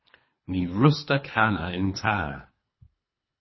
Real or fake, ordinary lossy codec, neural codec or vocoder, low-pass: fake; MP3, 24 kbps; codec, 24 kHz, 3 kbps, HILCodec; 7.2 kHz